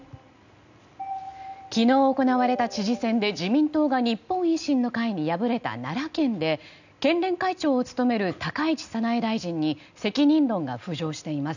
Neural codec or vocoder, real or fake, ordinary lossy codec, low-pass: none; real; none; 7.2 kHz